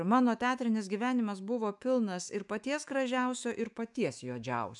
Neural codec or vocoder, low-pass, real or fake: autoencoder, 48 kHz, 128 numbers a frame, DAC-VAE, trained on Japanese speech; 10.8 kHz; fake